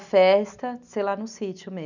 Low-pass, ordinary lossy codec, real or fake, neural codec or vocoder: 7.2 kHz; none; real; none